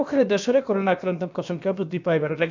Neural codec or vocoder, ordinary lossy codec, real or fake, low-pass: codec, 16 kHz, about 1 kbps, DyCAST, with the encoder's durations; none; fake; 7.2 kHz